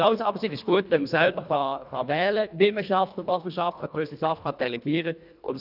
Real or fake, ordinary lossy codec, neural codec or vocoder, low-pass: fake; none; codec, 24 kHz, 1.5 kbps, HILCodec; 5.4 kHz